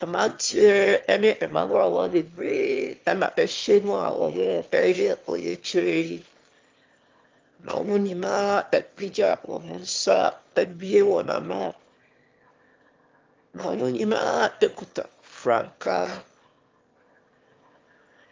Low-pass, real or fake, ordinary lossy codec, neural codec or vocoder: 7.2 kHz; fake; Opus, 32 kbps; autoencoder, 22.05 kHz, a latent of 192 numbers a frame, VITS, trained on one speaker